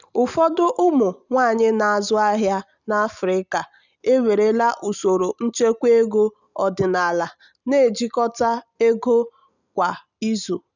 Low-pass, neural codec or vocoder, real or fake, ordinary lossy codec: 7.2 kHz; none; real; none